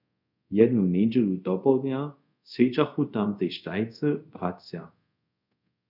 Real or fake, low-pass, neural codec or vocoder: fake; 5.4 kHz; codec, 24 kHz, 0.5 kbps, DualCodec